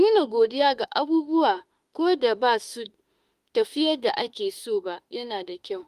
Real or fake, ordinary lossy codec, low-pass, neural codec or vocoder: fake; Opus, 24 kbps; 14.4 kHz; autoencoder, 48 kHz, 32 numbers a frame, DAC-VAE, trained on Japanese speech